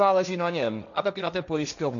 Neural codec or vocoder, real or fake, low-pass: codec, 16 kHz, 1.1 kbps, Voila-Tokenizer; fake; 7.2 kHz